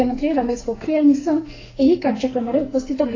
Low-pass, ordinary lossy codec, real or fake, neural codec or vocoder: 7.2 kHz; AAC, 32 kbps; fake; codec, 44.1 kHz, 2.6 kbps, SNAC